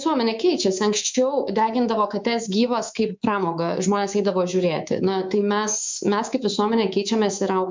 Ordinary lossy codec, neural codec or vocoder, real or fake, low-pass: MP3, 64 kbps; codec, 24 kHz, 3.1 kbps, DualCodec; fake; 7.2 kHz